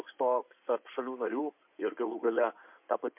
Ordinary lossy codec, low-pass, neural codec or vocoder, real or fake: MP3, 32 kbps; 3.6 kHz; codec, 16 kHz in and 24 kHz out, 2.2 kbps, FireRedTTS-2 codec; fake